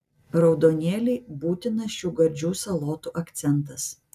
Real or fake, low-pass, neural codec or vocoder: real; 14.4 kHz; none